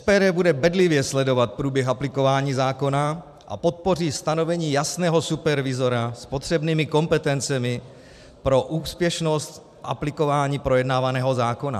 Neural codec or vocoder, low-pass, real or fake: vocoder, 44.1 kHz, 128 mel bands every 512 samples, BigVGAN v2; 14.4 kHz; fake